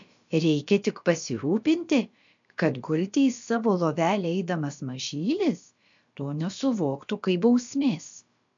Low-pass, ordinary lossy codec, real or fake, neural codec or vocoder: 7.2 kHz; MP3, 64 kbps; fake; codec, 16 kHz, about 1 kbps, DyCAST, with the encoder's durations